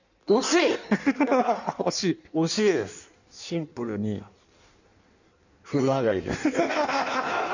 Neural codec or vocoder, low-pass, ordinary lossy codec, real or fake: codec, 16 kHz in and 24 kHz out, 1.1 kbps, FireRedTTS-2 codec; 7.2 kHz; none; fake